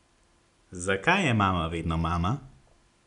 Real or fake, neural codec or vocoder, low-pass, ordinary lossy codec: real; none; 10.8 kHz; none